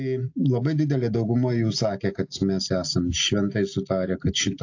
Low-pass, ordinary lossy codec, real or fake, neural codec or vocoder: 7.2 kHz; AAC, 48 kbps; real; none